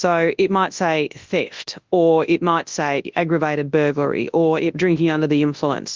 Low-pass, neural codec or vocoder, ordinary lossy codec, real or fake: 7.2 kHz; codec, 24 kHz, 0.9 kbps, WavTokenizer, large speech release; Opus, 32 kbps; fake